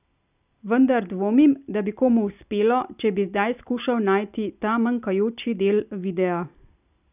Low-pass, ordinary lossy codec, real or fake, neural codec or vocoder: 3.6 kHz; none; real; none